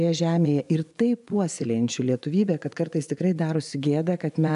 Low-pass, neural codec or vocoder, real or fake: 10.8 kHz; vocoder, 24 kHz, 100 mel bands, Vocos; fake